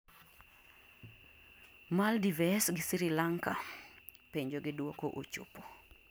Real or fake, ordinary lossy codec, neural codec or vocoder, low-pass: real; none; none; none